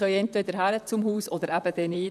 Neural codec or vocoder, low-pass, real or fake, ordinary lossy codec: vocoder, 44.1 kHz, 128 mel bands, Pupu-Vocoder; 14.4 kHz; fake; none